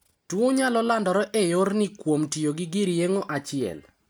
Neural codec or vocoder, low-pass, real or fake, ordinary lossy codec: none; none; real; none